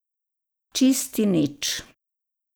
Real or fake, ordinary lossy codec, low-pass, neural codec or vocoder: real; none; none; none